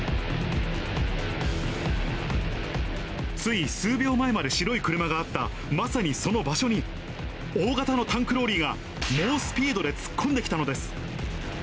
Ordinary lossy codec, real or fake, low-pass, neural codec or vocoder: none; real; none; none